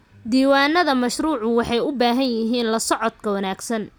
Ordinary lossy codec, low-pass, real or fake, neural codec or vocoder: none; none; real; none